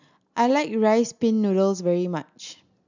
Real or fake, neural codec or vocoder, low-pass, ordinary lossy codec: real; none; 7.2 kHz; none